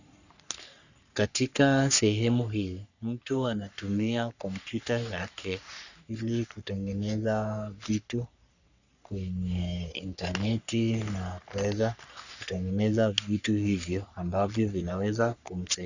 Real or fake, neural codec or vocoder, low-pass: fake; codec, 44.1 kHz, 3.4 kbps, Pupu-Codec; 7.2 kHz